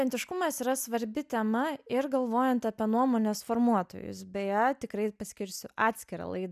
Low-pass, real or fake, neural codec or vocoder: 14.4 kHz; real; none